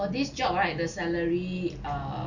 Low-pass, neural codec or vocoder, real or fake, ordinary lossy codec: 7.2 kHz; none; real; none